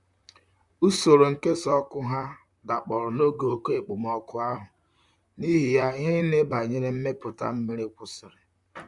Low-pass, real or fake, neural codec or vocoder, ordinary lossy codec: 10.8 kHz; fake; vocoder, 44.1 kHz, 128 mel bands, Pupu-Vocoder; none